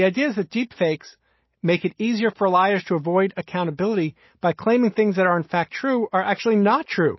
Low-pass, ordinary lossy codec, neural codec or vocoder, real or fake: 7.2 kHz; MP3, 24 kbps; none; real